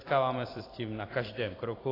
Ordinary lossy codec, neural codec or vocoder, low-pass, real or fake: AAC, 24 kbps; vocoder, 44.1 kHz, 128 mel bands every 256 samples, BigVGAN v2; 5.4 kHz; fake